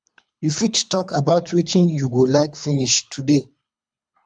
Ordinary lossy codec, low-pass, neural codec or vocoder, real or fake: none; 9.9 kHz; codec, 24 kHz, 3 kbps, HILCodec; fake